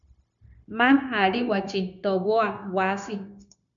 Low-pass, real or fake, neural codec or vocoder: 7.2 kHz; fake; codec, 16 kHz, 0.9 kbps, LongCat-Audio-Codec